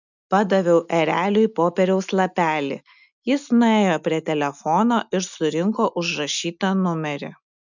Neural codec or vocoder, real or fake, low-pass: none; real; 7.2 kHz